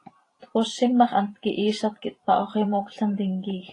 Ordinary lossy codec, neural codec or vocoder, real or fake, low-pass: AAC, 32 kbps; vocoder, 44.1 kHz, 128 mel bands every 512 samples, BigVGAN v2; fake; 10.8 kHz